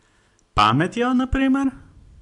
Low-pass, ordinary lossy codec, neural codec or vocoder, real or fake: 10.8 kHz; AAC, 64 kbps; none; real